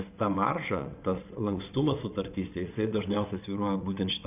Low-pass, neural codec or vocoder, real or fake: 3.6 kHz; vocoder, 22.05 kHz, 80 mel bands, WaveNeXt; fake